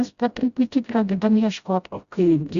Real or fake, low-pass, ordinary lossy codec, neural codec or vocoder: fake; 7.2 kHz; Opus, 64 kbps; codec, 16 kHz, 0.5 kbps, FreqCodec, smaller model